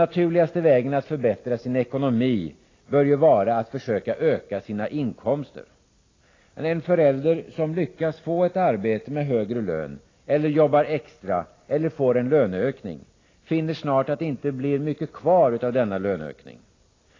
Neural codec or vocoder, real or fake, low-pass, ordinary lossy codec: none; real; 7.2 kHz; AAC, 32 kbps